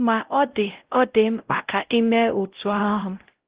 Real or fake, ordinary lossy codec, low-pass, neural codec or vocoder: fake; Opus, 16 kbps; 3.6 kHz; codec, 16 kHz, 0.5 kbps, X-Codec, WavLM features, trained on Multilingual LibriSpeech